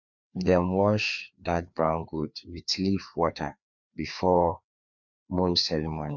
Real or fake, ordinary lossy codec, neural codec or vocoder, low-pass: fake; none; codec, 16 kHz, 2 kbps, FreqCodec, larger model; 7.2 kHz